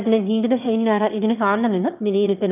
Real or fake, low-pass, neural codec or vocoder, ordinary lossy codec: fake; 3.6 kHz; autoencoder, 22.05 kHz, a latent of 192 numbers a frame, VITS, trained on one speaker; none